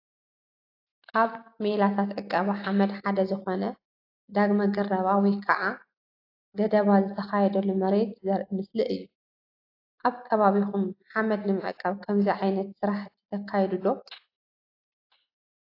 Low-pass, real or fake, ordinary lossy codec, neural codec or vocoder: 5.4 kHz; real; AAC, 32 kbps; none